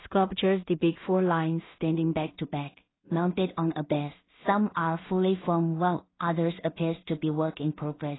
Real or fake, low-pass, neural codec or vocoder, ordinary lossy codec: fake; 7.2 kHz; codec, 16 kHz in and 24 kHz out, 0.4 kbps, LongCat-Audio-Codec, two codebook decoder; AAC, 16 kbps